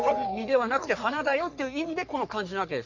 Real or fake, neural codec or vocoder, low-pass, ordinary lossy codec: fake; codec, 44.1 kHz, 3.4 kbps, Pupu-Codec; 7.2 kHz; none